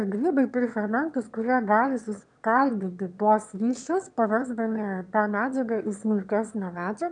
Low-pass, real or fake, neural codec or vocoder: 9.9 kHz; fake; autoencoder, 22.05 kHz, a latent of 192 numbers a frame, VITS, trained on one speaker